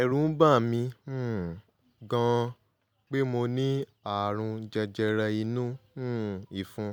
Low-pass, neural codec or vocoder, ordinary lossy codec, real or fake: none; none; none; real